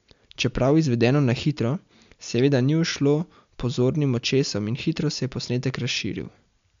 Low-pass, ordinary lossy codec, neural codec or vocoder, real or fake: 7.2 kHz; MP3, 64 kbps; none; real